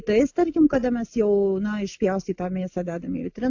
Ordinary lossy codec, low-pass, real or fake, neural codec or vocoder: AAC, 48 kbps; 7.2 kHz; real; none